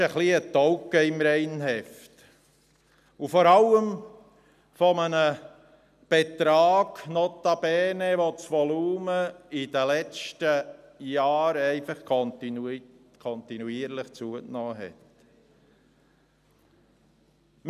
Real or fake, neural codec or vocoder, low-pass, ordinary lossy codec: real; none; 14.4 kHz; none